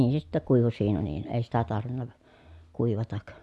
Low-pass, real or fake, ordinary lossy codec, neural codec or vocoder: none; real; none; none